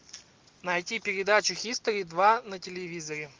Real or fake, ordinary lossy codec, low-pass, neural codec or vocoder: real; Opus, 32 kbps; 7.2 kHz; none